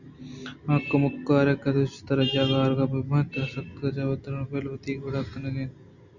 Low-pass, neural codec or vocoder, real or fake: 7.2 kHz; none; real